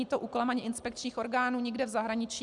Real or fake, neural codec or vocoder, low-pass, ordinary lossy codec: real; none; 10.8 kHz; Opus, 64 kbps